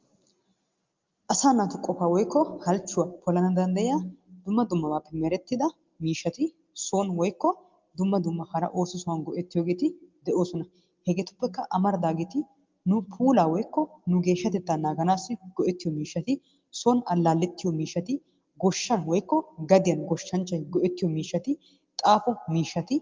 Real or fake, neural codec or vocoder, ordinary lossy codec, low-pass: real; none; Opus, 32 kbps; 7.2 kHz